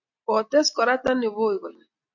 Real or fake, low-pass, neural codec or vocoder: real; 7.2 kHz; none